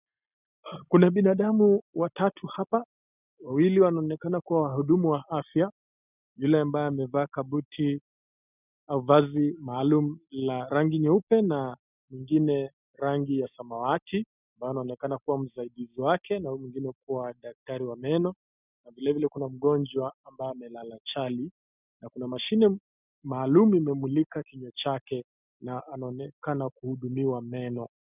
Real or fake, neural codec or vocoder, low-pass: real; none; 3.6 kHz